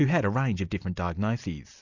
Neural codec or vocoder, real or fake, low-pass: none; real; 7.2 kHz